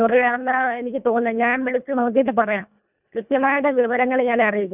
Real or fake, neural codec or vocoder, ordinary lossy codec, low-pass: fake; codec, 24 kHz, 1.5 kbps, HILCodec; none; 3.6 kHz